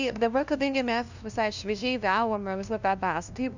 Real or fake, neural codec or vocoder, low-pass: fake; codec, 16 kHz, 0.5 kbps, FunCodec, trained on LibriTTS, 25 frames a second; 7.2 kHz